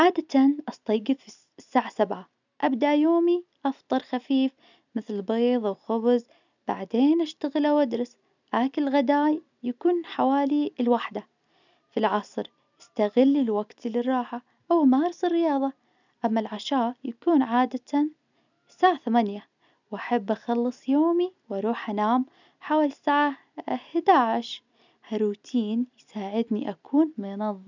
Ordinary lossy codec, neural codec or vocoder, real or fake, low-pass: none; none; real; 7.2 kHz